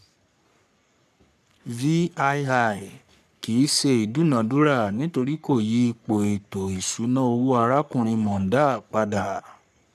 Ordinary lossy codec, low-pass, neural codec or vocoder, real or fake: none; 14.4 kHz; codec, 44.1 kHz, 3.4 kbps, Pupu-Codec; fake